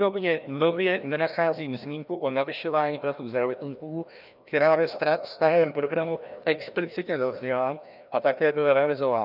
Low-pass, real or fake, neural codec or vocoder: 5.4 kHz; fake; codec, 16 kHz, 1 kbps, FreqCodec, larger model